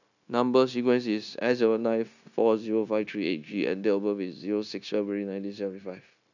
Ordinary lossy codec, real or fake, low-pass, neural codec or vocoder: none; fake; 7.2 kHz; codec, 16 kHz, 0.9 kbps, LongCat-Audio-Codec